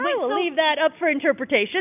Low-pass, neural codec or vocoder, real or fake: 3.6 kHz; none; real